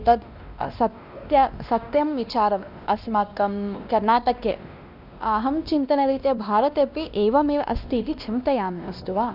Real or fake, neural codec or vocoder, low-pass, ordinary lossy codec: fake; codec, 16 kHz, 1 kbps, X-Codec, WavLM features, trained on Multilingual LibriSpeech; 5.4 kHz; none